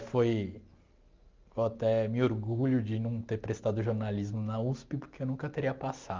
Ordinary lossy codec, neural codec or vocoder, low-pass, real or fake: Opus, 24 kbps; none; 7.2 kHz; real